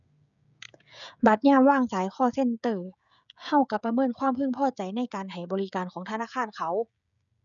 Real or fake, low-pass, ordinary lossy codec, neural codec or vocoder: fake; 7.2 kHz; none; codec, 16 kHz, 16 kbps, FreqCodec, smaller model